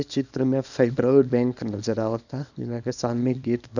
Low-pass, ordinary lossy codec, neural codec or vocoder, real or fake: 7.2 kHz; none; codec, 24 kHz, 0.9 kbps, WavTokenizer, small release; fake